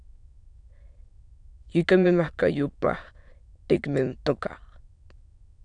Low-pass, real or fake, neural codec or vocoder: 9.9 kHz; fake; autoencoder, 22.05 kHz, a latent of 192 numbers a frame, VITS, trained on many speakers